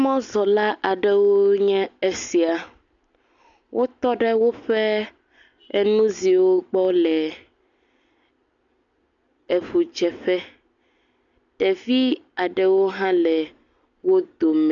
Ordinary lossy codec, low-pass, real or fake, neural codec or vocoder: MP3, 64 kbps; 7.2 kHz; real; none